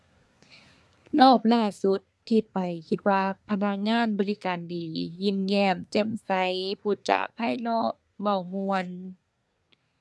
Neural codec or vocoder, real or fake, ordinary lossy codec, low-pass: codec, 24 kHz, 1 kbps, SNAC; fake; none; none